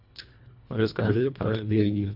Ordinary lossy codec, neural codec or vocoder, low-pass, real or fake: none; codec, 24 kHz, 1.5 kbps, HILCodec; 5.4 kHz; fake